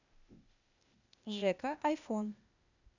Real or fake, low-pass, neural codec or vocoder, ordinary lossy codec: fake; 7.2 kHz; codec, 16 kHz, 0.8 kbps, ZipCodec; none